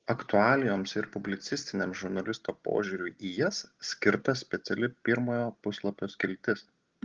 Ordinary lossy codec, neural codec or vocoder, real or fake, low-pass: Opus, 16 kbps; none; real; 7.2 kHz